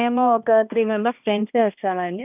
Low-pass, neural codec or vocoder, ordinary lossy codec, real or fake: 3.6 kHz; codec, 16 kHz, 1 kbps, X-Codec, HuBERT features, trained on balanced general audio; none; fake